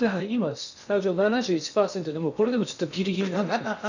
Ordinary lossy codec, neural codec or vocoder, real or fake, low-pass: none; codec, 16 kHz in and 24 kHz out, 0.8 kbps, FocalCodec, streaming, 65536 codes; fake; 7.2 kHz